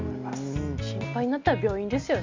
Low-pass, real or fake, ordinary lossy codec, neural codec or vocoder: 7.2 kHz; real; MP3, 48 kbps; none